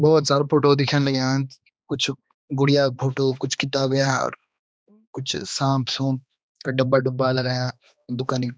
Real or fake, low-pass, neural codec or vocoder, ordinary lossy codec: fake; none; codec, 16 kHz, 2 kbps, X-Codec, HuBERT features, trained on balanced general audio; none